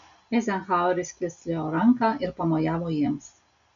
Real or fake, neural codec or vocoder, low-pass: real; none; 7.2 kHz